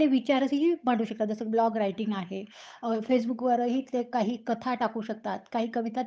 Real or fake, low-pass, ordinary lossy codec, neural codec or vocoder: fake; none; none; codec, 16 kHz, 8 kbps, FunCodec, trained on Chinese and English, 25 frames a second